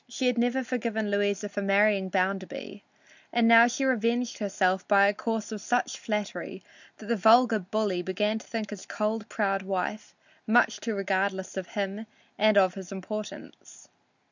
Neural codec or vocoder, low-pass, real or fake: none; 7.2 kHz; real